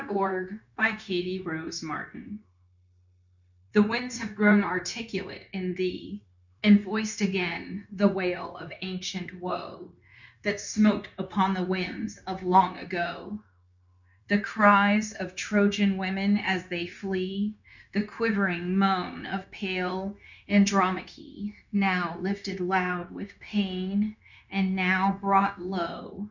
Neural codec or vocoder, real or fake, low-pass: codec, 16 kHz, 0.9 kbps, LongCat-Audio-Codec; fake; 7.2 kHz